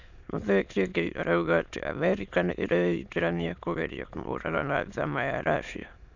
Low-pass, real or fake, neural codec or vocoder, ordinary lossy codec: 7.2 kHz; fake; autoencoder, 22.05 kHz, a latent of 192 numbers a frame, VITS, trained on many speakers; none